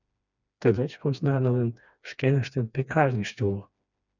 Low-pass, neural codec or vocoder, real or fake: 7.2 kHz; codec, 16 kHz, 2 kbps, FreqCodec, smaller model; fake